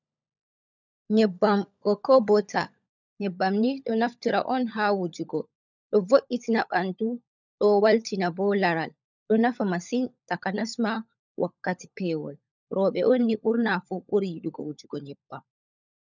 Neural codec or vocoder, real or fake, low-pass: codec, 16 kHz, 16 kbps, FunCodec, trained on LibriTTS, 50 frames a second; fake; 7.2 kHz